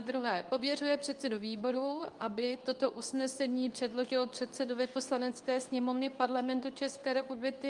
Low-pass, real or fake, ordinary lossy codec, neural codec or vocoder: 10.8 kHz; fake; Opus, 32 kbps; codec, 24 kHz, 0.9 kbps, WavTokenizer, medium speech release version 2